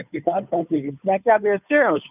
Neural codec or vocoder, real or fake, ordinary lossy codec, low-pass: codec, 16 kHz, 2 kbps, FunCodec, trained on Chinese and English, 25 frames a second; fake; none; 3.6 kHz